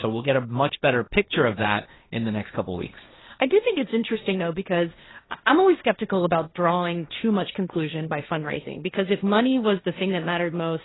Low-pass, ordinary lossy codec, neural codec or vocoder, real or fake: 7.2 kHz; AAC, 16 kbps; codec, 16 kHz, 1.1 kbps, Voila-Tokenizer; fake